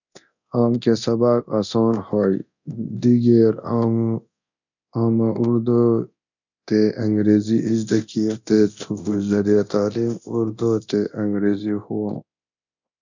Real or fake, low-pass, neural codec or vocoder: fake; 7.2 kHz; codec, 24 kHz, 0.9 kbps, DualCodec